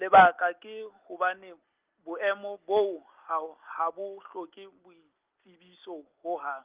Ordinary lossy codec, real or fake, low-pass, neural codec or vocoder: Opus, 32 kbps; real; 3.6 kHz; none